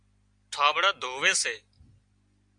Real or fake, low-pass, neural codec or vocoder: real; 9.9 kHz; none